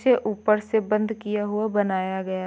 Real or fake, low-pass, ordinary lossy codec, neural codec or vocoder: real; none; none; none